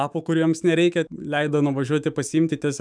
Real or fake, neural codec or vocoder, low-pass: fake; autoencoder, 48 kHz, 128 numbers a frame, DAC-VAE, trained on Japanese speech; 9.9 kHz